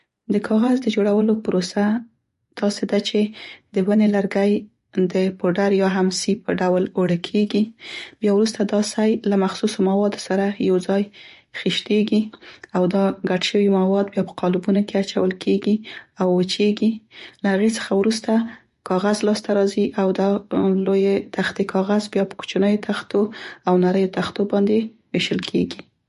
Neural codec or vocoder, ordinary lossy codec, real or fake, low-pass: vocoder, 24 kHz, 100 mel bands, Vocos; MP3, 48 kbps; fake; 10.8 kHz